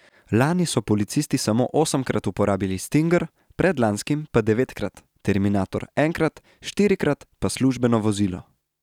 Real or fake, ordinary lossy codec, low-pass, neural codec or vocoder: real; none; 19.8 kHz; none